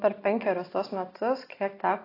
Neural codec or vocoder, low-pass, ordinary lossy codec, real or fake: none; 5.4 kHz; AAC, 24 kbps; real